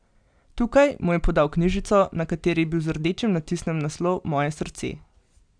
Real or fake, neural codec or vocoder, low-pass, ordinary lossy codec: real; none; 9.9 kHz; none